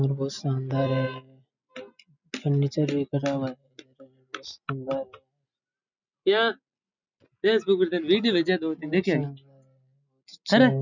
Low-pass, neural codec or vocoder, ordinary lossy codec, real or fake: 7.2 kHz; none; none; real